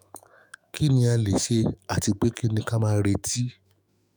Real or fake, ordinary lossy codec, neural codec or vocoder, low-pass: fake; none; autoencoder, 48 kHz, 128 numbers a frame, DAC-VAE, trained on Japanese speech; none